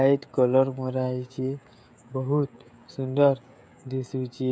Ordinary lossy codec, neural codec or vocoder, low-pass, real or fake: none; codec, 16 kHz, 16 kbps, FreqCodec, smaller model; none; fake